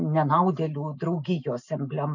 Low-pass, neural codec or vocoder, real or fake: 7.2 kHz; none; real